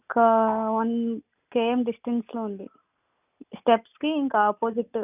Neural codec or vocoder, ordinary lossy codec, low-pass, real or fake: none; none; 3.6 kHz; real